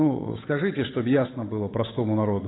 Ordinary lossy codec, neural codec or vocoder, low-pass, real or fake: AAC, 16 kbps; codec, 16 kHz, 8 kbps, FunCodec, trained on Chinese and English, 25 frames a second; 7.2 kHz; fake